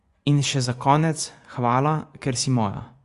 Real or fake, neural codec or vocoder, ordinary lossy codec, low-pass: fake; vocoder, 24 kHz, 100 mel bands, Vocos; Opus, 64 kbps; 10.8 kHz